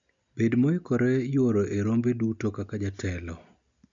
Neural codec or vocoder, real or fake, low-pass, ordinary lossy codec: none; real; 7.2 kHz; none